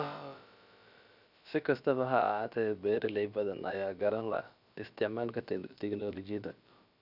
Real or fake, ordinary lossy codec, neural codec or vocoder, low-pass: fake; none; codec, 16 kHz, about 1 kbps, DyCAST, with the encoder's durations; 5.4 kHz